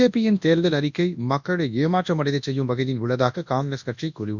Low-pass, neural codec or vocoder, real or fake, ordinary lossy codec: 7.2 kHz; codec, 24 kHz, 0.9 kbps, WavTokenizer, large speech release; fake; none